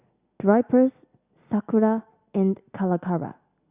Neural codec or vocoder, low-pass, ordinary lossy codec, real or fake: none; 3.6 kHz; Opus, 64 kbps; real